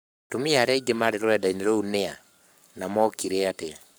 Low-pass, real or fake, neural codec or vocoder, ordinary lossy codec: none; fake; codec, 44.1 kHz, 7.8 kbps, Pupu-Codec; none